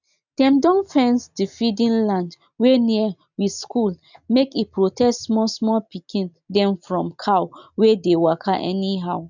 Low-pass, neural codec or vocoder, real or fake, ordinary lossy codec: 7.2 kHz; none; real; none